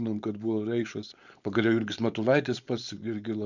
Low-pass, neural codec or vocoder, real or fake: 7.2 kHz; codec, 16 kHz, 4.8 kbps, FACodec; fake